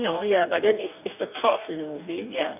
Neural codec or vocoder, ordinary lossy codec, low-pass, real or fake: codec, 44.1 kHz, 2.6 kbps, DAC; none; 3.6 kHz; fake